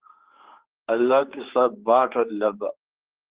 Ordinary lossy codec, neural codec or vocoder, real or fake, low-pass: Opus, 16 kbps; codec, 16 kHz, 4 kbps, X-Codec, HuBERT features, trained on general audio; fake; 3.6 kHz